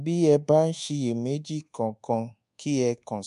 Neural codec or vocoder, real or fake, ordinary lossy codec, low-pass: codec, 24 kHz, 1.2 kbps, DualCodec; fake; MP3, 64 kbps; 10.8 kHz